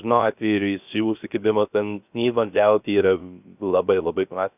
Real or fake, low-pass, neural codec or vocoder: fake; 3.6 kHz; codec, 16 kHz, 0.3 kbps, FocalCodec